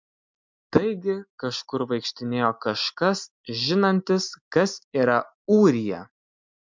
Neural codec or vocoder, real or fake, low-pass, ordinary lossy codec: none; real; 7.2 kHz; MP3, 64 kbps